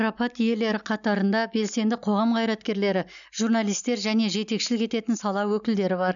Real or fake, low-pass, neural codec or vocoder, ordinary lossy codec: real; 7.2 kHz; none; none